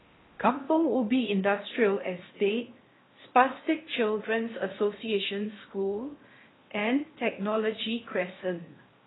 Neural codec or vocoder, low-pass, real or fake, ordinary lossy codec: codec, 16 kHz in and 24 kHz out, 0.8 kbps, FocalCodec, streaming, 65536 codes; 7.2 kHz; fake; AAC, 16 kbps